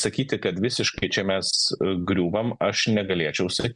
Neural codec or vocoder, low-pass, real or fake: none; 10.8 kHz; real